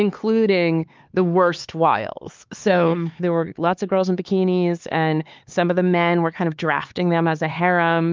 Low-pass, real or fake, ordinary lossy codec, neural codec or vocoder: 7.2 kHz; fake; Opus, 24 kbps; codec, 16 kHz, 4 kbps, X-Codec, HuBERT features, trained on LibriSpeech